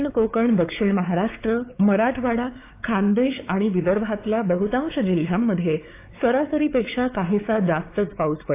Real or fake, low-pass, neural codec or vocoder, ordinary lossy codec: fake; 3.6 kHz; codec, 16 kHz, 4 kbps, X-Codec, WavLM features, trained on Multilingual LibriSpeech; AAC, 24 kbps